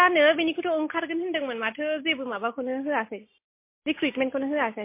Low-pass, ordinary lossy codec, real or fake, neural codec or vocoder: 3.6 kHz; MP3, 24 kbps; real; none